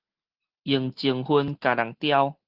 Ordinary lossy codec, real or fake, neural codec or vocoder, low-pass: Opus, 16 kbps; real; none; 5.4 kHz